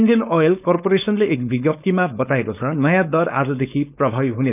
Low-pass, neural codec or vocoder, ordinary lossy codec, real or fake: 3.6 kHz; codec, 16 kHz, 4.8 kbps, FACodec; none; fake